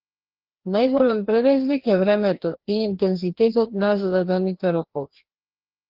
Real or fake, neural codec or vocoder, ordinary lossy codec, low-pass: fake; codec, 16 kHz, 1 kbps, FreqCodec, larger model; Opus, 16 kbps; 5.4 kHz